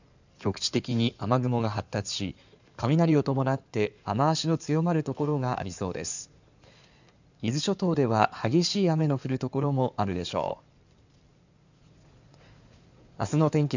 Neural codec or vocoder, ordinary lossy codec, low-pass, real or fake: codec, 16 kHz in and 24 kHz out, 2.2 kbps, FireRedTTS-2 codec; none; 7.2 kHz; fake